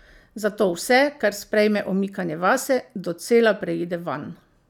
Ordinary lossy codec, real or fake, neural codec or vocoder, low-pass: none; real; none; 19.8 kHz